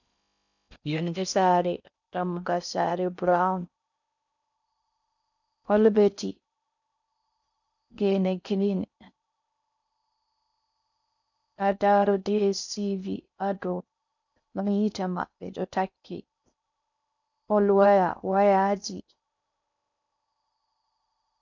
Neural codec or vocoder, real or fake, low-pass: codec, 16 kHz in and 24 kHz out, 0.6 kbps, FocalCodec, streaming, 4096 codes; fake; 7.2 kHz